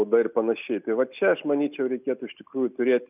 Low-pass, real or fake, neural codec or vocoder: 3.6 kHz; real; none